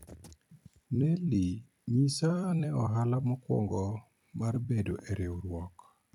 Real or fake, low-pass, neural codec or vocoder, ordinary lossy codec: fake; 19.8 kHz; vocoder, 44.1 kHz, 128 mel bands every 256 samples, BigVGAN v2; none